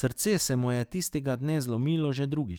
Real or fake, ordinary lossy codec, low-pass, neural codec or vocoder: fake; none; none; codec, 44.1 kHz, 7.8 kbps, DAC